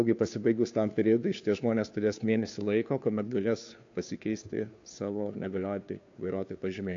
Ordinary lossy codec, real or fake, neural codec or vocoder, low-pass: AAC, 48 kbps; fake; codec, 16 kHz, 2 kbps, FunCodec, trained on Chinese and English, 25 frames a second; 7.2 kHz